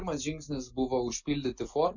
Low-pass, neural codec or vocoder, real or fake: 7.2 kHz; none; real